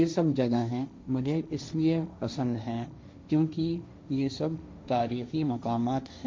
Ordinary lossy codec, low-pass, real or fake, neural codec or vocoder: none; none; fake; codec, 16 kHz, 1.1 kbps, Voila-Tokenizer